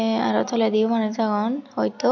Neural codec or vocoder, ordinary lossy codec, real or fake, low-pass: none; none; real; 7.2 kHz